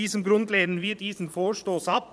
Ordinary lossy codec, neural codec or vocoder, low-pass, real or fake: none; vocoder, 22.05 kHz, 80 mel bands, Vocos; none; fake